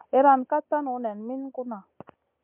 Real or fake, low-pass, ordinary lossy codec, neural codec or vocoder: real; 3.6 kHz; MP3, 32 kbps; none